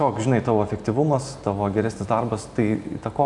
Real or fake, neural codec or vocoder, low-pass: real; none; 10.8 kHz